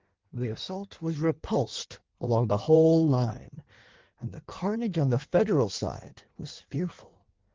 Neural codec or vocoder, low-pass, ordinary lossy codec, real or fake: codec, 16 kHz in and 24 kHz out, 1.1 kbps, FireRedTTS-2 codec; 7.2 kHz; Opus, 16 kbps; fake